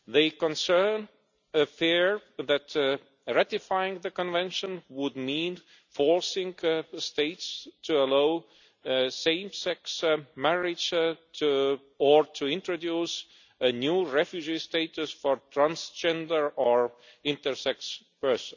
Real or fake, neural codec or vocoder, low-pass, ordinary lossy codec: real; none; 7.2 kHz; none